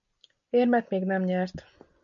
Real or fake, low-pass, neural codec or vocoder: real; 7.2 kHz; none